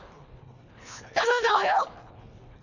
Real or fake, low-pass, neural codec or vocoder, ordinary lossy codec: fake; 7.2 kHz; codec, 24 kHz, 1.5 kbps, HILCodec; none